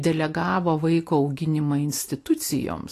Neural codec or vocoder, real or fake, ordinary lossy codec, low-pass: none; real; AAC, 48 kbps; 14.4 kHz